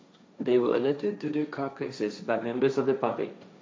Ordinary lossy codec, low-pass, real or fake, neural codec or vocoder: none; none; fake; codec, 16 kHz, 1.1 kbps, Voila-Tokenizer